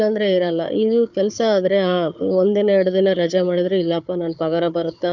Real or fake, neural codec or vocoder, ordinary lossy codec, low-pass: fake; codec, 16 kHz, 4 kbps, FunCodec, trained on Chinese and English, 50 frames a second; none; 7.2 kHz